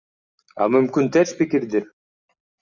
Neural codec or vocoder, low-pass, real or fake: none; 7.2 kHz; real